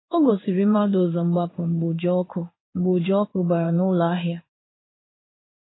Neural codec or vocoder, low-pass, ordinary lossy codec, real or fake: codec, 16 kHz in and 24 kHz out, 1 kbps, XY-Tokenizer; 7.2 kHz; AAC, 16 kbps; fake